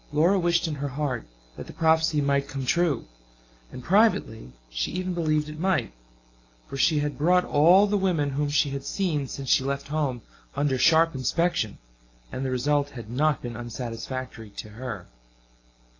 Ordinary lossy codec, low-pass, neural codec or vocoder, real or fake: AAC, 32 kbps; 7.2 kHz; none; real